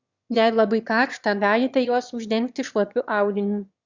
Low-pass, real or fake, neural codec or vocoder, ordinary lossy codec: 7.2 kHz; fake; autoencoder, 22.05 kHz, a latent of 192 numbers a frame, VITS, trained on one speaker; Opus, 64 kbps